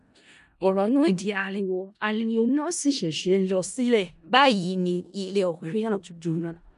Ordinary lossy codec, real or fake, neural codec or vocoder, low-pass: none; fake; codec, 16 kHz in and 24 kHz out, 0.4 kbps, LongCat-Audio-Codec, four codebook decoder; 10.8 kHz